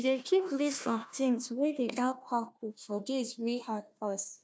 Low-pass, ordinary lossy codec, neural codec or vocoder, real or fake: none; none; codec, 16 kHz, 1 kbps, FunCodec, trained on Chinese and English, 50 frames a second; fake